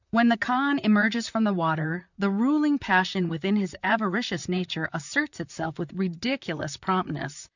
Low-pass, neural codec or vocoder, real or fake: 7.2 kHz; vocoder, 44.1 kHz, 128 mel bands, Pupu-Vocoder; fake